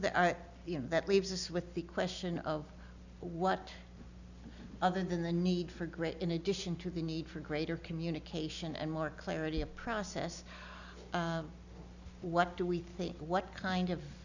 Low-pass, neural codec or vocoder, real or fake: 7.2 kHz; none; real